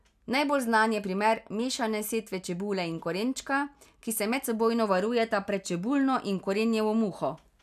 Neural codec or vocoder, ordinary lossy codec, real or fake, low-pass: none; none; real; 14.4 kHz